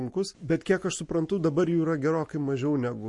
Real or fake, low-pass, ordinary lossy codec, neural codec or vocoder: real; 10.8 kHz; MP3, 48 kbps; none